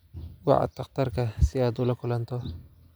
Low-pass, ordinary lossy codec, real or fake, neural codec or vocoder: none; none; real; none